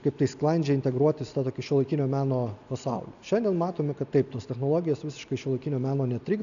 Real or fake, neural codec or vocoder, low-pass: real; none; 7.2 kHz